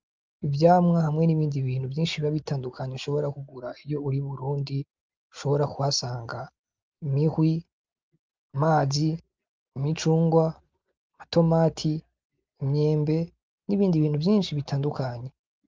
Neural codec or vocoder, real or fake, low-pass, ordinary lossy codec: none; real; 7.2 kHz; Opus, 32 kbps